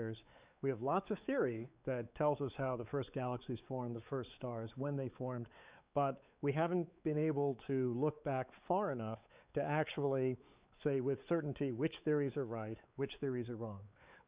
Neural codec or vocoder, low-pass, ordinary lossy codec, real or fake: codec, 16 kHz, 4 kbps, X-Codec, WavLM features, trained on Multilingual LibriSpeech; 3.6 kHz; Opus, 64 kbps; fake